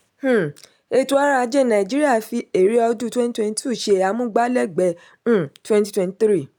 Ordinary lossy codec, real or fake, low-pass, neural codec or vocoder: none; real; none; none